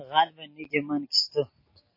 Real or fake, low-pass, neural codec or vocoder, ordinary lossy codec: real; 5.4 kHz; none; MP3, 24 kbps